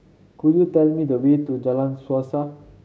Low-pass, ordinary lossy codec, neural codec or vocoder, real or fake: none; none; codec, 16 kHz, 6 kbps, DAC; fake